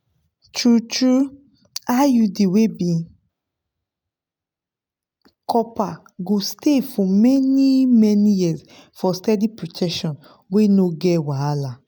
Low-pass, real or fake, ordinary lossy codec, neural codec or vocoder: none; real; none; none